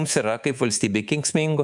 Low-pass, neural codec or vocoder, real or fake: 10.8 kHz; codec, 24 kHz, 3.1 kbps, DualCodec; fake